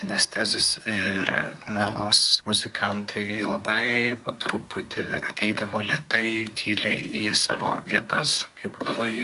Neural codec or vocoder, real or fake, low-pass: codec, 24 kHz, 1 kbps, SNAC; fake; 10.8 kHz